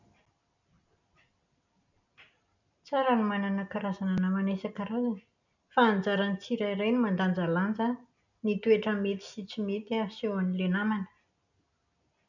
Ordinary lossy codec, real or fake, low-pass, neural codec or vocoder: none; real; 7.2 kHz; none